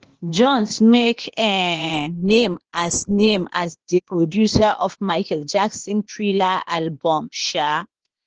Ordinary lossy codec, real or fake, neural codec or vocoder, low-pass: Opus, 16 kbps; fake; codec, 16 kHz, 0.8 kbps, ZipCodec; 7.2 kHz